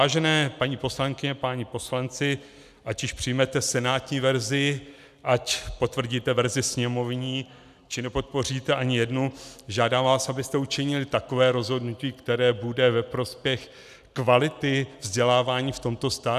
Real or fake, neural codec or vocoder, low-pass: real; none; 14.4 kHz